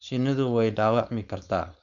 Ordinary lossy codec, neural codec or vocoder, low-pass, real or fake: none; codec, 16 kHz, 4.8 kbps, FACodec; 7.2 kHz; fake